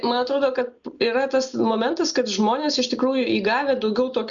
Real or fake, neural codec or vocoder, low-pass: real; none; 7.2 kHz